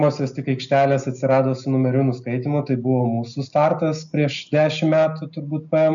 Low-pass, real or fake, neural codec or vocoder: 7.2 kHz; real; none